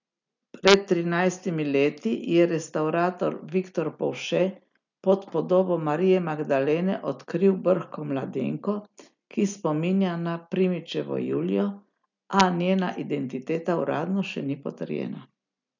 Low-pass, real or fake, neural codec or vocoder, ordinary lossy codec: 7.2 kHz; real; none; none